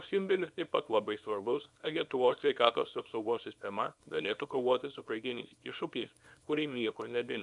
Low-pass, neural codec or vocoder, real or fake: 10.8 kHz; codec, 24 kHz, 0.9 kbps, WavTokenizer, small release; fake